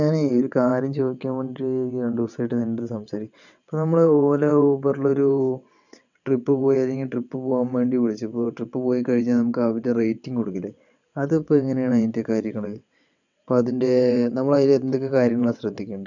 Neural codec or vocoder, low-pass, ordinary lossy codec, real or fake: vocoder, 22.05 kHz, 80 mel bands, WaveNeXt; 7.2 kHz; none; fake